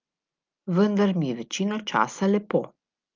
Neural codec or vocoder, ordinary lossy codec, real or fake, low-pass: none; Opus, 32 kbps; real; 7.2 kHz